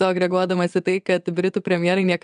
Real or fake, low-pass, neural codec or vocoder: fake; 9.9 kHz; vocoder, 22.05 kHz, 80 mel bands, WaveNeXt